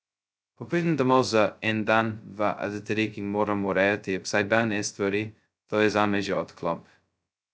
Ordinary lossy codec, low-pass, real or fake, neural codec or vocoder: none; none; fake; codec, 16 kHz, 0.2 kbps, FocalCodec